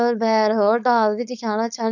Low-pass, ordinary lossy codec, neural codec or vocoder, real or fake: 7.2 kHz; none; codec, 16 kHz, 4.8 kbps, FACodec; fake